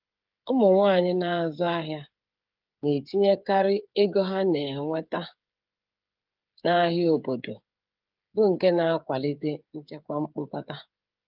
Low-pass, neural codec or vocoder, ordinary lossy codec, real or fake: 5.4 kHz; codec, 16 kHz, 8 kbps, FreqCodec, smaller model; Opus, 24 kbps; fake